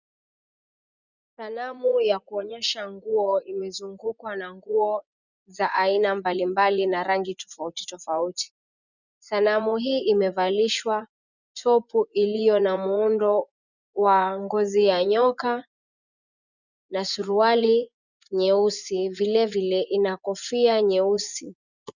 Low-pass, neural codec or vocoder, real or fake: 7.2 kHz; vocoder, 24 kHz, 100 mel bands, Vocos; fake